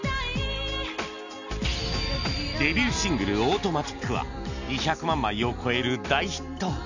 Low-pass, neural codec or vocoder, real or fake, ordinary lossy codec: 7.2 kHz; none; real; none